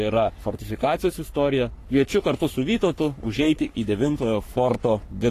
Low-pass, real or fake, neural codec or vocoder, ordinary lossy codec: 14.4 kHz; fake; codec, 44.1 kHz, 3.4 kbps, Pupu-Codec; AAC, 48 kbps